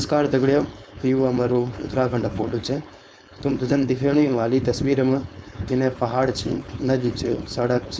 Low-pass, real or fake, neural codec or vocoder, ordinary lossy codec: none; fake; codec, 16 kHz, 4.8 kbps, FACodec; none